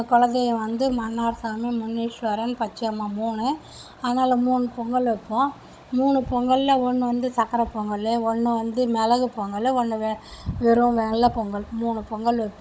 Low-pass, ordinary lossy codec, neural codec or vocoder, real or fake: none; none; codec, 16 kHz, 16 kbps, FunCodec, trained on Chinese and English, 50 frames a second; fake